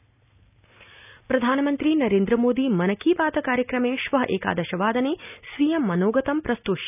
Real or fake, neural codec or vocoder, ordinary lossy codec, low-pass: real; none; none; 3.6 kHz